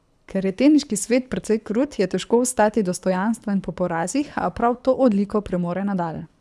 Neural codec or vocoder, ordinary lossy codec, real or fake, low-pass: codec, 24 kHz, 6 kbps, HILCodec; none; fake; none